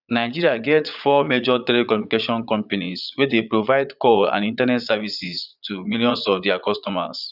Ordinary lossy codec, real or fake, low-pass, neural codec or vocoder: none; fake; 5.4 kHz; vocoder, 22.05 kHz, 80 mel bands, Vocos